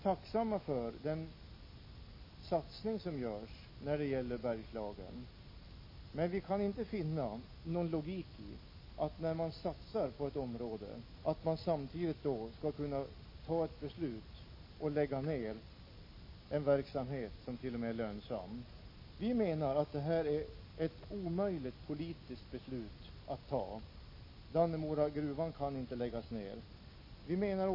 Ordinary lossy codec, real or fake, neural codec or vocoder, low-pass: MP3, 24 kbps; real; none; 5.4 kHz